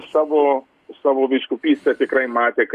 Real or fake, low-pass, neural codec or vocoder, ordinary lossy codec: real; 14.4 kHz; none; Opus, 64 kbps